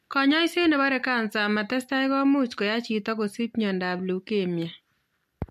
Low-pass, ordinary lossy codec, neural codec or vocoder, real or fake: 14.4 kHz; MP3, 64 kbps; none; real